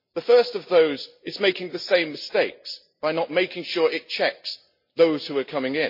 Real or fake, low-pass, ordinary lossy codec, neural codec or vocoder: real; 5.4 kHz; MP3, 32 kbps; none